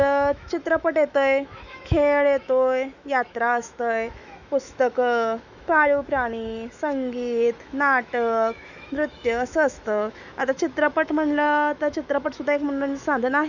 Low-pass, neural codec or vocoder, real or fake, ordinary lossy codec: 7.2 kHz; autoencoder, 48 kHz, 128 numbers a frame, DAC-VAE, trained on Japanese speech; fake; none